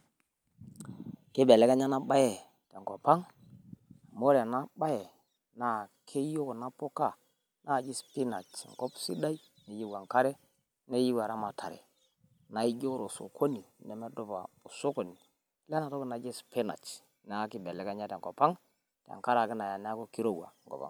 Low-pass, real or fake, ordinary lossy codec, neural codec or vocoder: none; real; none; none